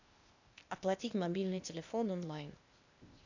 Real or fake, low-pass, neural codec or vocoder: fake; 7.2 kHz; codec, 16 kHz, 0.8 kbps, ZipCodec